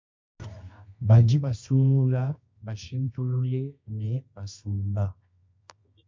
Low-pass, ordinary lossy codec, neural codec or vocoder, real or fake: 7.2 kHz; MP3, 64 kbps; codec, 24 kHz, 0.9 kbps, WavTokenizer, medium music audio release; fake